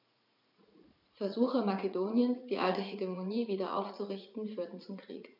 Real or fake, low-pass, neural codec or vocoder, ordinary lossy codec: fake; 5.4 kHz; vocoder, 44.1 kHz, 80 mel bands, Vocos; none